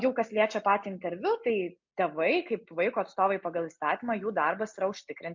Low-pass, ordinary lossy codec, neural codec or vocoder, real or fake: 7.2 kHz; MP3, 48 kbps; none; real